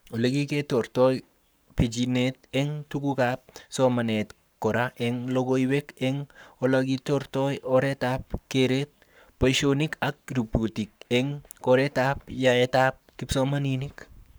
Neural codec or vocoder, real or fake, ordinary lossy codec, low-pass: codec, 44.1 kHz, 7.8 kbps, Pupu-Codec; fake; none; none